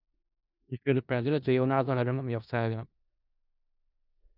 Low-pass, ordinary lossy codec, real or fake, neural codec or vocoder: 5.4 kHz; none; fake; codec, 16 kHz in and 24 kHz out, 0.4 kbps, LongCat-Audio-Codec, four codebook decoder